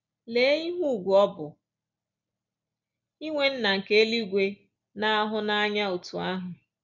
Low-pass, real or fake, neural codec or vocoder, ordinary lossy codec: 7.2 kHz; real; none; none